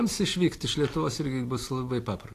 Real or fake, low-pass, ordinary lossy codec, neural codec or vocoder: real; 14.4 kHz; AAC, 48 kbps; none